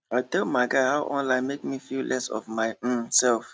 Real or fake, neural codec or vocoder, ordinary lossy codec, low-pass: real; none; none; none